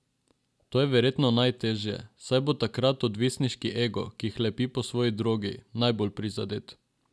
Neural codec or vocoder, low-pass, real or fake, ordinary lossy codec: none; none; real; none